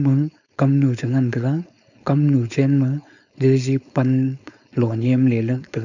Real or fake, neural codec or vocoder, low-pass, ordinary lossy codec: fake; codec, 16 kHz, 4.8 kbps, FACodec; 7.2 kHz; none